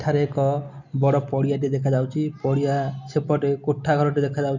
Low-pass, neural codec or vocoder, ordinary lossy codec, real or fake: 7.2 kHz; none; none; real